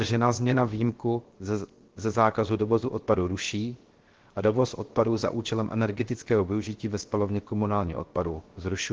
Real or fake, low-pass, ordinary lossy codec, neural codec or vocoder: fake; 7.2 kHz; Opus, 16 kbps; codec, 16 kHz, 0.7 kbps, FocalCodec